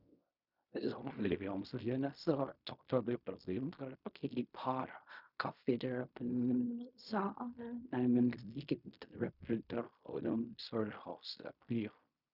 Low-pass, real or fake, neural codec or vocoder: 5.4 kHz; fake; codec, 16 kHz in and 24 kHz out, 0.4 kbps, LongCat-Audio-Codec, fine tuned four codebook decoder